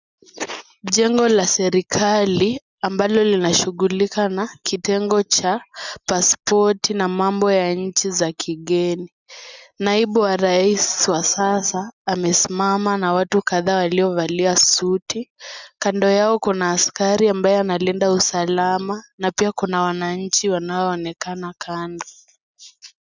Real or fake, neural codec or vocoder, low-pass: real; none; 7.2 kHz